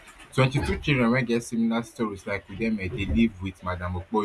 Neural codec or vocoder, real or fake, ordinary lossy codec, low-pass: none; real; none; none